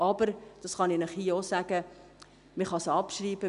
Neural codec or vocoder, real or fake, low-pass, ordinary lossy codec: none; real; 10.8 kHz; none